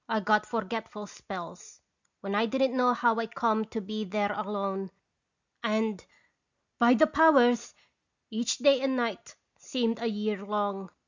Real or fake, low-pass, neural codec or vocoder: real; 7.2 kHz; none